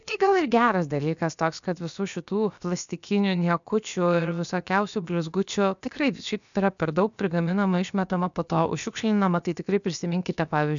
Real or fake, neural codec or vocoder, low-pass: fake; codec, 16 kHz, about 1 kbps, DyCAST, with the encoder's durations; 7.2 kHz